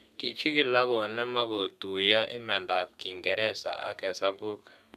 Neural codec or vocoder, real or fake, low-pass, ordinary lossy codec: codec, 32 kHz, 1.9 kbps, SNAC; fake; 14.4 kHz; none